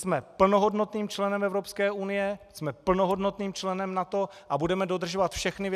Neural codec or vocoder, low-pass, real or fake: none; 14.4 kHz; real